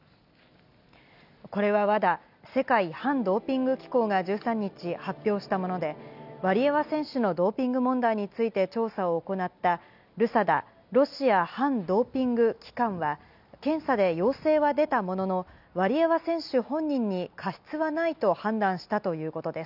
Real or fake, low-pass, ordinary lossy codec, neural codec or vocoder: real; 5.4 kHz; none; none